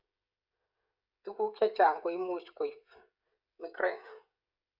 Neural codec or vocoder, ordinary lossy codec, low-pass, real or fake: codec, 16 kHz, 16 kbps, FreqCodec, smaller model; Opus, 64 kbps; 5.4 kHz; fake